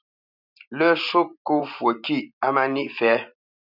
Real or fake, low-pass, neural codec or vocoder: fake; 5.4 kHz; vocoder, 44.1 kHz, 128 mel bands every 512 samples, BigVGAN v2